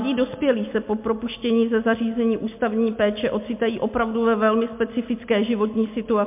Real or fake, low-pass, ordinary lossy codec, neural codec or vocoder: real; 3.6 kHz; MP3, 32 kbps; none